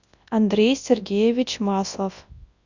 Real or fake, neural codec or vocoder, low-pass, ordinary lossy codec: fake; codec, 24 kHz, 0.9 kbps, WavTokenizer, large speech release; 7.2 kHz; Opus, 64 kbps